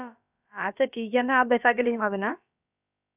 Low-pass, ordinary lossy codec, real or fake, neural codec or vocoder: 3.6 kHz; none; fake; codec, 16 kHz, about 1 kbps, DyCAST, with the encoder's durations